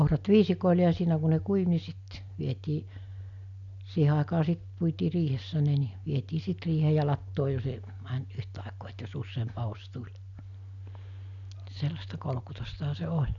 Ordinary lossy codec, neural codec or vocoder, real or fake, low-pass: none; none; real; 7.2 kHz